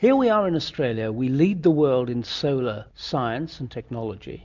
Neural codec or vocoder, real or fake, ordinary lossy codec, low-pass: none; real; MP3, 64 kbps; 7.2 kHz